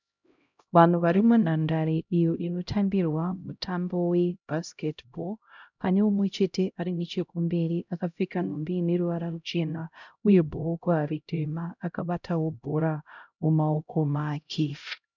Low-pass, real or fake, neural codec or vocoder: 7.2 kHz; fake; codec, 16 kHz, 0.5 kbps, X-Codec, HuBERT features, trained on LibriSpeech